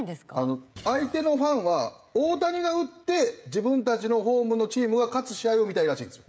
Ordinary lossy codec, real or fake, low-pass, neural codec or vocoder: none; fake; none; codec, 16 kHz, 16 kbps, FreqCodec, smaller model